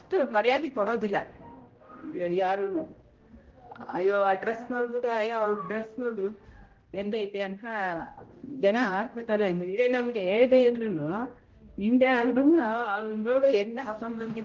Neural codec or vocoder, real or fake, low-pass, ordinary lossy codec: codec, 16 kHz, 0.5 kbps, X-Codec, HuBERT features, trained on general audio; fake; 7.2 kHz; Opus, 16 kbps